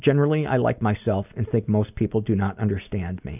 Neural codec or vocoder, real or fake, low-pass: none; real; 3.6 kHz